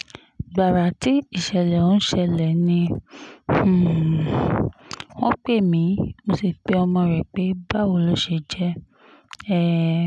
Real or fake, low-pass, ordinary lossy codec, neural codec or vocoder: real; none; none; none